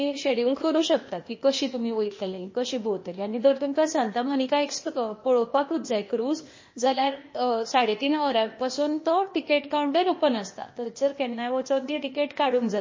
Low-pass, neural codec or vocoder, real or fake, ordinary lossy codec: 7.2 kHz; codec, 16 kHz, 0.8 kbps, ZipCodec; fake; MP3, 32 kbps